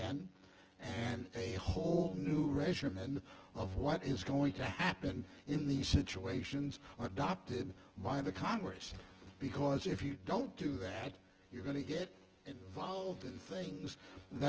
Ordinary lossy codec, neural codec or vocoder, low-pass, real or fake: Opus, 16 kbps; vocoder, 24 kHz, 100 mel bands, Vocos; 7.2 kHz; fake